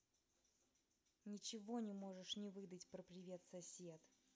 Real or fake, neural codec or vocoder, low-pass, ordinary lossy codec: real; none; none; none